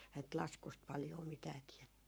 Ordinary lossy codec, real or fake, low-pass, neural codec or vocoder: none; fake; none; codec, 44.1 kHz, 7.8 kbps, Pupu-Codec